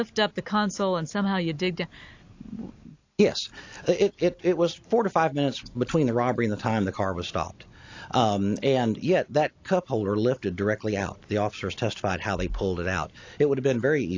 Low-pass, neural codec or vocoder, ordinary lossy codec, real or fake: 7.2 kHz; none; AAC, 48 kbps; real